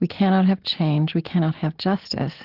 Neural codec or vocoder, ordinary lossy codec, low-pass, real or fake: none; Opus, 16 kbps; 5.4 kHz; real